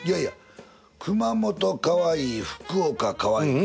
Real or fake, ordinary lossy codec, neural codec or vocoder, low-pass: real; none; none; none